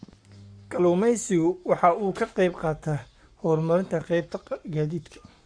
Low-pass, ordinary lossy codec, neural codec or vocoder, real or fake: 9.9 kHz; Opus, 64 kbps; codec, 44.1 kHz, 7.8 kbps, Pupu-Codec; fake